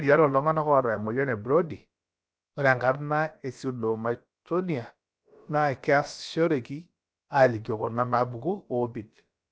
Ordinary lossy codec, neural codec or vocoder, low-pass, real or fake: none; codec, 16 kHz, about 1 kbps, DyCAST, with the encoder's durations; none; fake